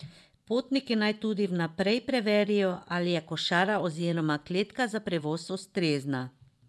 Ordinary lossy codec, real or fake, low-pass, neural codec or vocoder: none; fake; none; vocoder, 24 kHz, 100 mel bands, Vocos